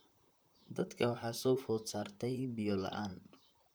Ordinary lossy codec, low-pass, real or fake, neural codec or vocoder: none; none; fake; vocoder, 44.1 kHz, 128 mel bands, Pupu-Vocoder